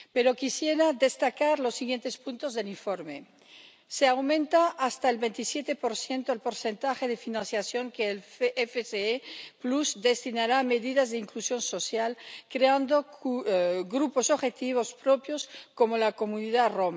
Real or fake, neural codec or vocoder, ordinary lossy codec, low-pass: real; none; none; none